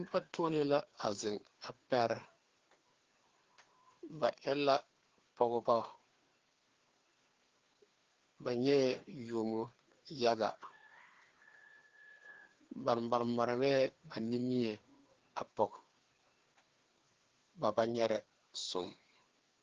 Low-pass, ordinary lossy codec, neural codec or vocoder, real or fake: 7.2 kHz; Opus, 16 kbps; codec, 16 kHz, 2 kbps, FreqCodec, larger model; fake